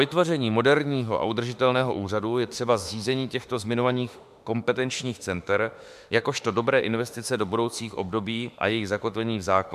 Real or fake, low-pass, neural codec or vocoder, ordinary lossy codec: fake; 14.4 kHz; autoencoder, 48 kHz, 32 numbers a frame, DAC-VAE, trained on Japanese speech; MP3, 96 kbps